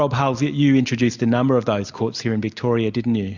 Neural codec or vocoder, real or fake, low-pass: none; real; 7.2 kHz